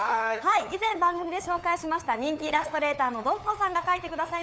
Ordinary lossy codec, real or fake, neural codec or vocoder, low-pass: none; fake; codec, 16 kHz, 8 kbps, FunCodec, trained on LibriTTS, 25 frames a second; none